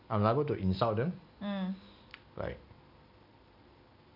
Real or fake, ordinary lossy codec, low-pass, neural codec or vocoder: real; none; 5.4 kHz; none